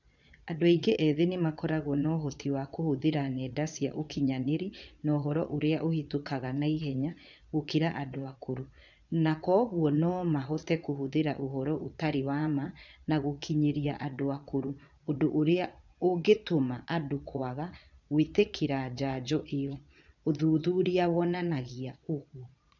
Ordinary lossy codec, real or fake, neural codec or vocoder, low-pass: none; fake; vocoder, 22.05 kHz, 80 mel bands, Vocos; 7.2 kHz